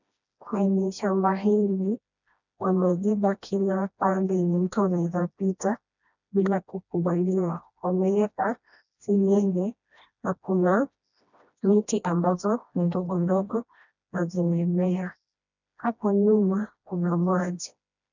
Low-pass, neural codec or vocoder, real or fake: 7.2 kHz; codec, 16 kHz, 1 kbps, FreqCodec, smaller model; fake